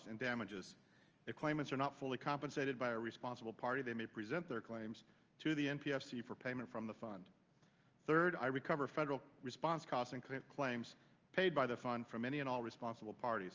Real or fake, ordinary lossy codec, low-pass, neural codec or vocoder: real; Opus, 24 kbps; 7.2 kHz; none